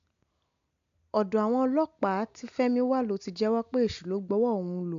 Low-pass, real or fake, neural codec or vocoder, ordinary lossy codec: 7.2 kHz; real; none; AAC, 64 kbps